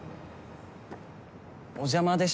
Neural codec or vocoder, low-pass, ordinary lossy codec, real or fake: none; none; none; real